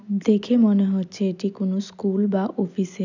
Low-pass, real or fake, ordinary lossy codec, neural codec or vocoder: 7.2 kHz; real; none; none